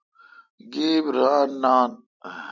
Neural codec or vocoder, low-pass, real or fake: none; 7.2 kHz; real